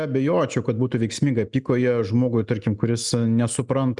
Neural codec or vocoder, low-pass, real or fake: none; 10.8 kHz; real